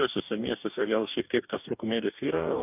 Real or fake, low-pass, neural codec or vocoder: fake; 3.6 kHz; codec, 44.1 kHz, 2.6 kbps, DAC